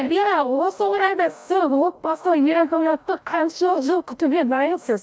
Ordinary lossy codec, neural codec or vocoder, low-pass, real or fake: none; codec, 16 kHz, 0.5 kbps, FreqCodec, larger model; none; fake